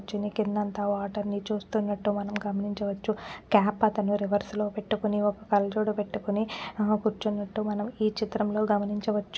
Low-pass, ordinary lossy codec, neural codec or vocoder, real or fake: none; none; none; real